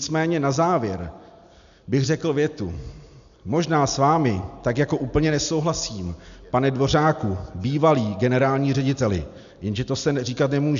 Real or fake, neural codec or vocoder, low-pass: real; none; 7.2 kHz